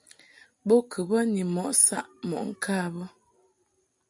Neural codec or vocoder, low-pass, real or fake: none; 10.8 kHz; real